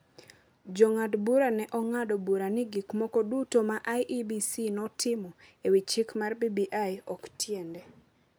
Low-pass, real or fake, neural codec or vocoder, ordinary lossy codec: none; real; none; none